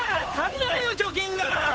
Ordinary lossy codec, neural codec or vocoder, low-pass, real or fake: none; codec, 16 kHz, 8 kbps, FunCodec, trained on Chinese and English, 25 frames a second; none; fake